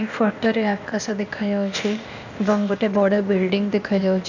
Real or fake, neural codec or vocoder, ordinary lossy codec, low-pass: fake; codec, 16 kHz, 0.8 kbps, ZipCodec; none; 7.2 kHz